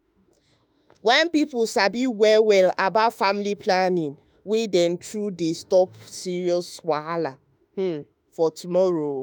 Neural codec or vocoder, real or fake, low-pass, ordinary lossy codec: autoencoder, 48 kHz, 32 numbers a frame, DAC-VAE, trained on Japanese speech; fake; none; none